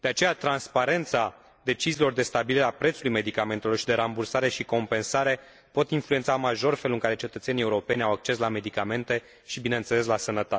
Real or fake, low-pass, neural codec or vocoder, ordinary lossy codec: real; none; none; none